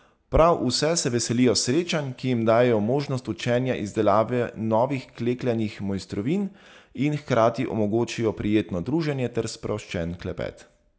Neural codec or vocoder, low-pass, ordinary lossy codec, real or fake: none; none; none; real